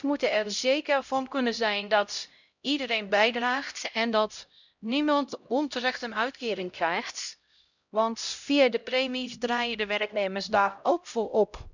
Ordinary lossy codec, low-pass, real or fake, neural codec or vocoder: none; 7.2 kHz; fake; codec, 16 kHz, 0.5 kbps, X-Codec, HuBERT features, trained on LibriSpeech